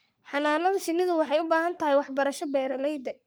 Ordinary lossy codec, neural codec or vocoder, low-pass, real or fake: none; codec, 44.1 kHz, 3.4 kbps, Pupu-Codec; none; fake